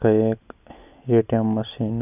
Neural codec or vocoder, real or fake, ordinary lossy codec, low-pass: none; real; none; 3.6 kHz